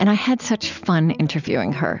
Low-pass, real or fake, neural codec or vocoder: 7.2 kHz; real; none